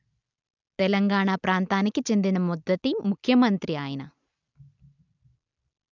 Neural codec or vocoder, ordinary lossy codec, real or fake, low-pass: none; none; real; 7.2 kHz